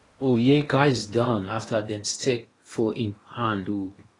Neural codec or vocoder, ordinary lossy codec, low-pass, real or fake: codec, 16 kHz in and 24 kHz out, 0.8 kbps, FocalCodec, streaming, 65536 codes; AAC, 32 kbps; 10.8 kHz; fake